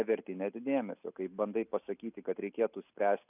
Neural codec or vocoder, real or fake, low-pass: none; real; 3.6 kHz